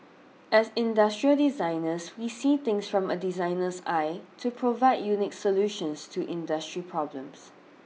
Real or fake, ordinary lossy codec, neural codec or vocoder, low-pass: real; none; none; none